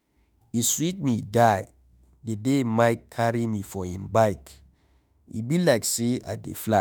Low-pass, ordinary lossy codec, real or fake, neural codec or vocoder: none; none; fake; autoencoder, 48 kHz, 32 numbers a frame, DAC-VAE, trained on Japanese speech